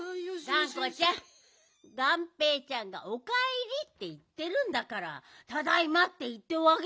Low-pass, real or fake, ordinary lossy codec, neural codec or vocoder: none; real; none; none